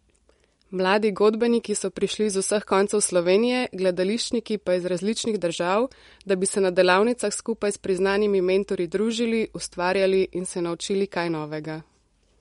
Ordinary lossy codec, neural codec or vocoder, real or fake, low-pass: MP3, 48 kbps; none; real; 10.8 kHz